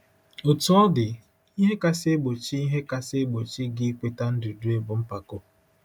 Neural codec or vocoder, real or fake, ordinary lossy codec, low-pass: none; real; none; 19.8 kHz